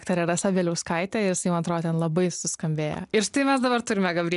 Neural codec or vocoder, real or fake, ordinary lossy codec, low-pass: none; real; MP3, 96 kbps; 10.8 kHz